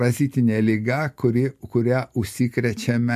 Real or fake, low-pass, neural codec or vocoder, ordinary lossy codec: real; 14.4 kHz; none; MP3, 64 kbps